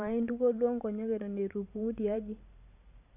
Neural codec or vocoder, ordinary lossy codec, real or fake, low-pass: vocoder, 44.1 kHz, 128 mel bands every 512 samples, BigVGAN v2; AAC, 32 kbps; fake; 3.6 kHz